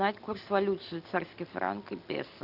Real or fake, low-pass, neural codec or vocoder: real; 5.4 kHz; none